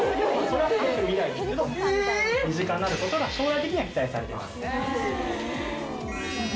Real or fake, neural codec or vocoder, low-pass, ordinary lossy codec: real; none; none; none